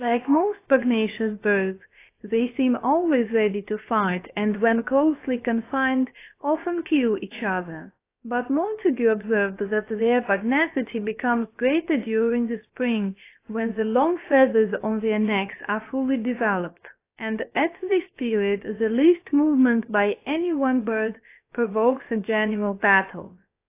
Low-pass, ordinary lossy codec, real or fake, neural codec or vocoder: 3.6 kHz; AAC, 24 kbps; fake; codec, 16 kHz, about 1 kbps, DyCAST, with the encoder's durations